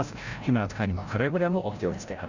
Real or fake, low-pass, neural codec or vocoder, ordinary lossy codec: fake; 7.2 kHz; codec, 16 kHz, 0.5 kbps, FreqCodec, larger model; none